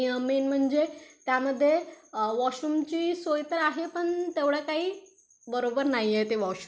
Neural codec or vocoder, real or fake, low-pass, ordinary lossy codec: none; real; none; none